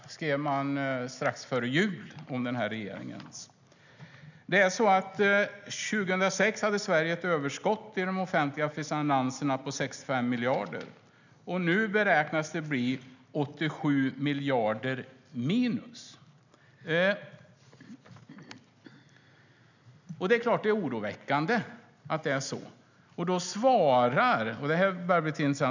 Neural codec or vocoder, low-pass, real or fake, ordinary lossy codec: none; 7.2 kHz; real; none